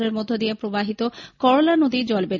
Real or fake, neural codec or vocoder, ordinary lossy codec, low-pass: real; none; none; 7.2 kHz